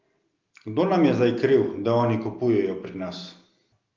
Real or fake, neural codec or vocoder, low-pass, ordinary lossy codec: real; none; 7.2 kHz; Opus, 32 kbps